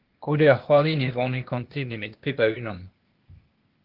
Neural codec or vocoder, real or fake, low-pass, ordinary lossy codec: codec, 16 kHz, 0.8 kbps, ZipCodec; fake; 5.4 kHz; Opus, 16 kbps